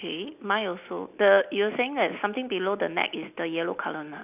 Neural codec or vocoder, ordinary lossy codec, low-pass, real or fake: none; none; 3.6 kHz; real